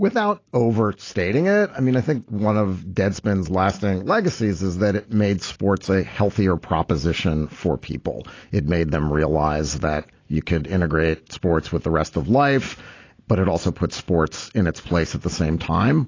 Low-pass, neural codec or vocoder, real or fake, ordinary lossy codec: 7.2 kHz; none; real; AAC, 32 kbps